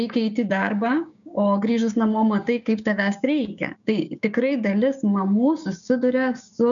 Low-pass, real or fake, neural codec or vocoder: 7.2 kHz; fake; codec, 16 kHz, 6 kbps, DAC